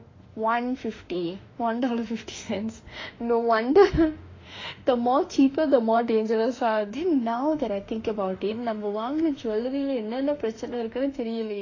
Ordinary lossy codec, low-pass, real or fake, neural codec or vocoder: AAC, 32 kbps; 7.2 kHz; fake; autoencoder, 48 kHz, 32 numbers a frame, DAC-VAE, trained on Japanese speech